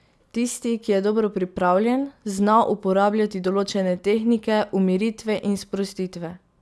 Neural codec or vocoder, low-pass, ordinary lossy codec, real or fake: none; none; none; real